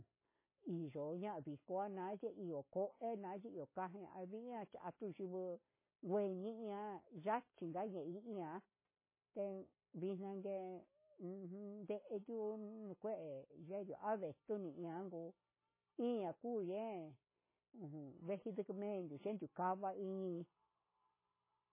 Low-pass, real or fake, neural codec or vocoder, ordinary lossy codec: 3.6 kHz; real; none; MP3, 16 kbps